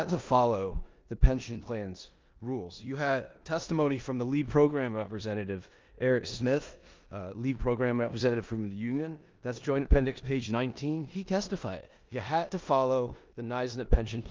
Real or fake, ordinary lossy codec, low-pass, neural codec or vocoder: fake; Opus, 24 kbps; 7.2 kHz; codec, 16 kHz in and 24 kHz out, 0.9 kbps, LongCat-Audio-Codec, four codebook decoder